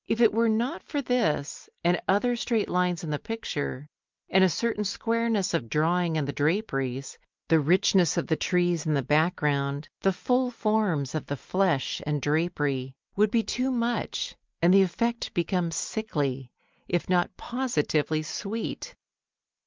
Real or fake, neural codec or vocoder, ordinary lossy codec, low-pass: real; none; Opus, 24 kbps; 7.2 kHz